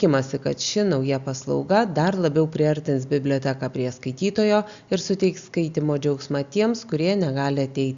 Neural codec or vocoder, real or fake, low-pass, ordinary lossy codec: none; real; 7.2 kHz; Opus, 64 kbps